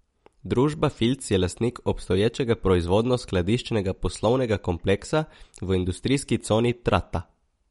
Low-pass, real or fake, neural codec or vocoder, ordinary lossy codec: 14.4 kHz; real; none; MP3, 48 kbps